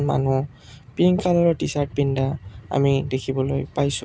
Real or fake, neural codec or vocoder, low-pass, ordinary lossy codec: real; none; none; none